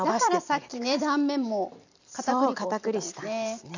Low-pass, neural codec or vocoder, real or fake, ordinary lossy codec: 7.2 kHz; vocoder, 44.1 kHz, 128 mel bands every 256 samples, BigVGAN v2; fake; none